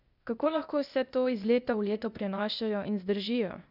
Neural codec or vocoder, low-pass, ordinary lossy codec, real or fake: codec, 16 kHz, 0.8 kbps, ZipCodec; 5.4 kHz; none; fake